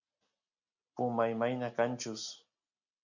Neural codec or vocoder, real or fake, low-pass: none; real; 7.2 kHz